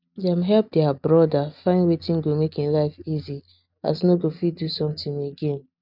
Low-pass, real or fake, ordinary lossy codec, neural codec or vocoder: 5.4 kHz; real; none; none